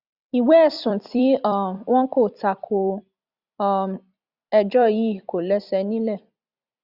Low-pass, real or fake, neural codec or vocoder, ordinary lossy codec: 5.4 kHz; fake; vocoder, 44.1 kHz, 128 mel bands every 256 samples, BigVGAN v2; Opus, 64 kbps